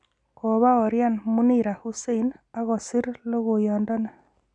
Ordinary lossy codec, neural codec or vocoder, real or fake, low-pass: none; none; real; 9.9 kHz